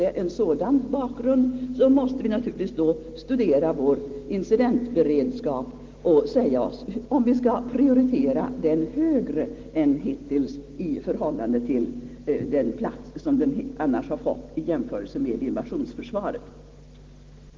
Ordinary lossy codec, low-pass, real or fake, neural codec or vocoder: Opus, 16 kbps; 7.2 kHz; real; none